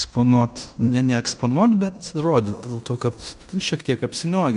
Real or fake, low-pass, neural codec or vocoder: fake; 10.8 kHz; codec, 16 kHz in and 24 kHz out, 0.9 kbps, LongCat-Audio-Codec, fine tuned four codebook decoder